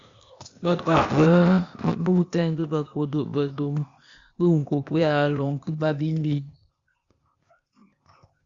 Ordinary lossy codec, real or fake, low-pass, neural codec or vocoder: Opus, 64 kbps; fake; 7.2 kHz; codec, 16 kHz, 0.8 kbps, ZipCodec